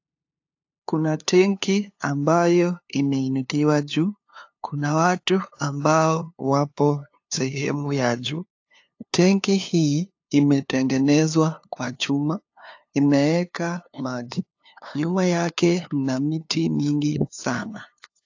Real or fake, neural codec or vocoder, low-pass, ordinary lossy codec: fake; codec, 16 kHz, 2 kbps, FunCodec, trained on LibriTTS, 25 frames a second; 7.2 kHz; AAC, 48 kbps